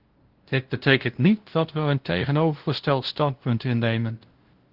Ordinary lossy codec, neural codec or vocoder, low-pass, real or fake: Opus, 16 kbps; codec, 16 kHz, 0.5 kbps, FunCodec, trained on LibriTTS, 25 frames a second; 5.4 kHz; fake